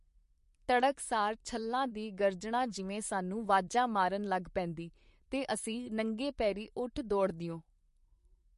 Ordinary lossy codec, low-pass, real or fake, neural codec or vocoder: MP3, 48 kbps; 14.4 kHz; fake; vocoder, 44.1 kHz, 128 mel bands, Pupu-Vocoder